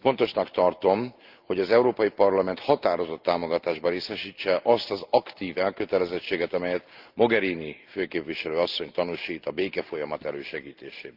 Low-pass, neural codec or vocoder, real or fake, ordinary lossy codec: 5.4 kHz; none; real; Opus, 32 kbps